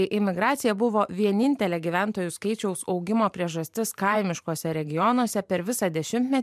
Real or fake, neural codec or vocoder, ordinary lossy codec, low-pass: fake; vocoder, 44.1 kHz, 128 mel bands every 512 samples, BigVGAN v2; MP3, 96 kbps; 14.4 kHz